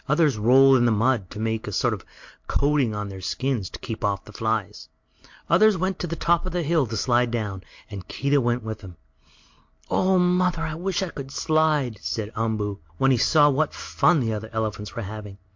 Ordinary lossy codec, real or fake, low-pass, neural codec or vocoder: MP3, 48 kbps; real; 7.2 kHz; none